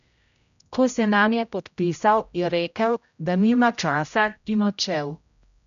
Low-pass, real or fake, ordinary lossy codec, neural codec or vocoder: 7.2 kHz; fake; none; codec, 16 kHz, 0.5 kbps, X-Codec, HuBERT features, trained on general audio